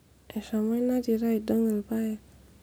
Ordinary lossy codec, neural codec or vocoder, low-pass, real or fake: none; none; none; real